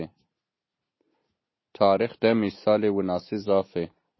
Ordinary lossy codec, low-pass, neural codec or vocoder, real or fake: MP3, 24 kbps; 7.2 kHz; none; real